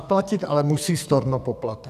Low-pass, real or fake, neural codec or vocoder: 14.4 kHz; fake; codec, 44.1 kHz, 2.6 kbps, SNAC